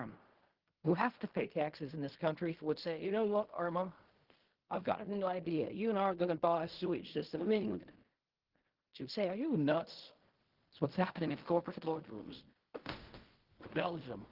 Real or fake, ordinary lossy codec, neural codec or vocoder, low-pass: fake; Opus, 16 kbps; codec, 16 kHz in and 24 kHz out, 0.4 kbps, LongCat-Audio-Codec, fine tuned four codebook decoder; 5.4 kHz